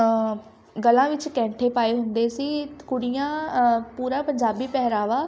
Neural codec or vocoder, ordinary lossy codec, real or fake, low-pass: none; none; real; none